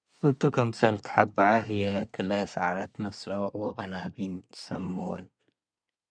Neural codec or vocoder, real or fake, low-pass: codec, 24 kHz, 1 kbps, SNAC; fake; 9.9 kHz